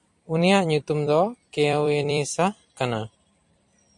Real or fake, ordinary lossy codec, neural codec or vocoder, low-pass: fake; MP3, 48 kbps; vocoder, 44.1 kHz, 128 mel bands every 256 samples, BigVGAN v2; 10.8 kHz